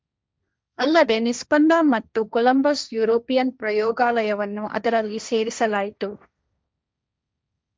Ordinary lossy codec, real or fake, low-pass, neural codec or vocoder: none; fake; none; codec, 16 kHz, 1.1 kbps, Voila-Tokenizer